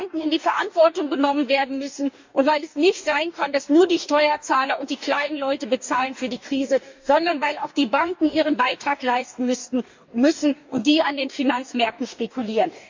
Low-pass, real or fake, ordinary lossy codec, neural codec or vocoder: 7.2 kHz; fake; MP3, 64 kbps; codec, 44.1 kHz, 2.6 kbps, DAC